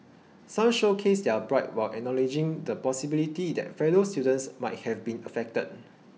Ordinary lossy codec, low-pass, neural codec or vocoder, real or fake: none; none; none; real